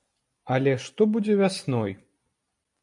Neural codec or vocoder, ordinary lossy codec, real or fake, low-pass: none; MP3, 64 kbps; real; 10.8 kHz